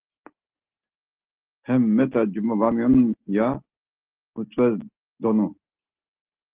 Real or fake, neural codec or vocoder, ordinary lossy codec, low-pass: real; none; Opus, 16 kbps; 3.6 kHz